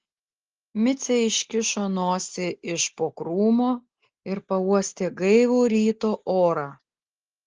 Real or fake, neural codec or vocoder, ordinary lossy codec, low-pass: real; none; Opus, 16 kbps; 7.2 kHz